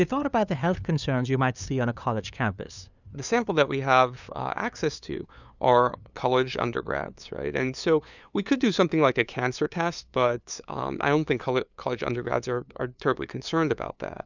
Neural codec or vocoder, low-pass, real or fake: codec, 16 kHz, 2 kbps, FunCodec, trained on LibriTTS, 25 frames a second; 7.2 kHz; fake